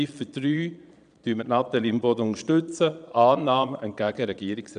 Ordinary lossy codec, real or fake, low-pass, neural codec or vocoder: none; fake; 9.9 kHz; vocoder, 22.05 kHz, 80 mel bands, Vocos